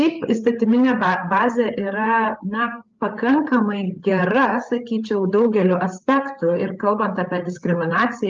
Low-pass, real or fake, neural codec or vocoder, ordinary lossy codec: 7.2 kHz; fake; codec, 16 kHz, 8 kbps, FreqCodec, larger model; Opus, 32 kbps